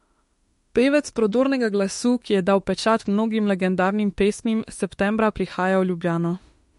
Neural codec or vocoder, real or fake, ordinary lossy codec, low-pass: autoencoder, 48 kHz, 32 numbers a frame, DAC-VAE, trained on Japanese speech; fake; MP3, 48 kbps; 14.4 kHz